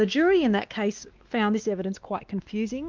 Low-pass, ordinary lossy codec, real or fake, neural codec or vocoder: 7.2 kHz; Opus, 24 kbps; fake; codec, 16 kHz, 2 kbps, X-Codec, WavLM features, trained on Multilingual LibriSpeech